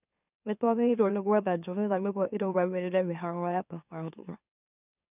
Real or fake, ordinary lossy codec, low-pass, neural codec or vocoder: fake; none; 3.6 kHz; autoencoder, 44.1 kHz, a latent of 192 numbers a frame, MeloTTS